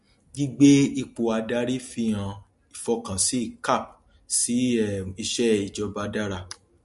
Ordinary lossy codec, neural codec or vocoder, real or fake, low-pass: MP3, 48 kbps; none; real; 14.4 kHz